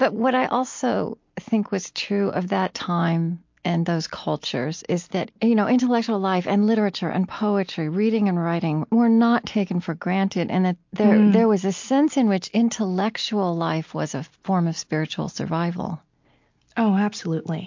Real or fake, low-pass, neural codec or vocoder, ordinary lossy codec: real; 7.2 kHz; none; MP3, 48 kbps